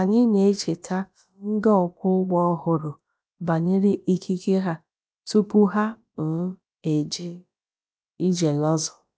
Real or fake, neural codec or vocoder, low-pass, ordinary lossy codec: fake; codec, 16 kHz, about 1 kbps, DyCAST, with the encoder's durations; none; none